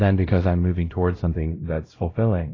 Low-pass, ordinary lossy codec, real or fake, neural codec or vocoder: 7.2 kHz; AAC, 32 kbps; fake; codec, 16 kHz, 0.5 kbps, X-Codec, WavLM features, trained on Multilingual LibriSpeech